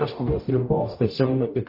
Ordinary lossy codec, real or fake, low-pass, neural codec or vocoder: MP3, 32 kbps; fake; 5.4 kHz; codec, 44.1 kHz, 0.9 kbps, DAC